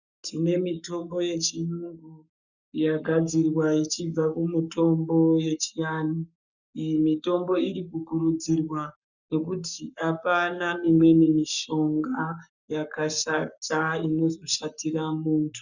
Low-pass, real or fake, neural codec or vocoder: 7.2 kHz; fake; codec, 44.1 kHz, 7.8 kbps, Pupu-Codec